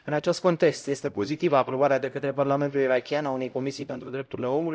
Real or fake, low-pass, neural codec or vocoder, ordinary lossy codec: fake; none; codec, 16 kHz, 0.5 kbps, X-Codec, HuBERT features, trained on LibriSpeech; none